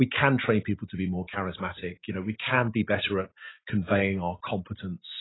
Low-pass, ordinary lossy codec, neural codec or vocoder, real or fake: 7.2 kHz; AAC, 16 kbps; none; real